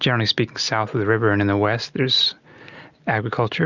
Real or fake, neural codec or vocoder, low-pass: real; none; 7.2 kHz